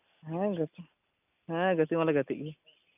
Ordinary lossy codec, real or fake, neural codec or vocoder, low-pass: none; real; none; 3.6 kHz